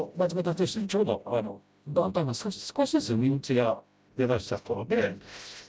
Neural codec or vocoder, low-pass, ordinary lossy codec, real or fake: codec, 16 kHz, 0.5 kbps, FreqCodec, smaller model; none; none; fake